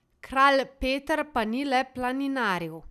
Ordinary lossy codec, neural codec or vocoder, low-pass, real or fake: none; none; 14.4 kHz; real